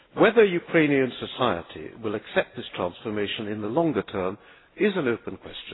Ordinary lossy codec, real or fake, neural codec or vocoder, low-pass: AAC, 16 kbps; real; none; 7.2 kHz